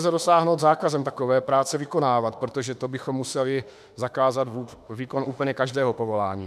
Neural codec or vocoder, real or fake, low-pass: autoencoder, 48 kHz, 32 numbers a frame, DAC-VAE, trained on Japanese speech; fake; 14.4 kHz